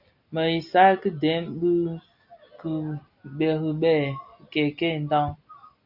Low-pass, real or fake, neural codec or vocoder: 5.4 kHz; real; none